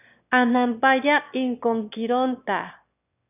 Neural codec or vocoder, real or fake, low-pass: autoencoder, 22.05 kHz, a latent of 192 numbers a frame, VITS, trained on one speaker; fake; 3.6 kHz